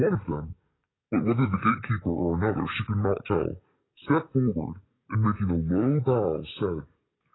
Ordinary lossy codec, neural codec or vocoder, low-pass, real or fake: AAC, 16 kbps; codec, 44.1 kHz, 7.8 kbps, DAC; 7.2 kHz; fake